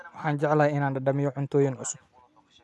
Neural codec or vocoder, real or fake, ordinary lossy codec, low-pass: none; real; none; none